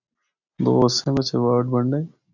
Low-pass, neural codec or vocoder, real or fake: 7.2 kHz; none; real